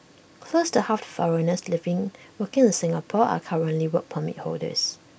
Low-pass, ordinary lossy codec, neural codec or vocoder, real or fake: none; none; none; real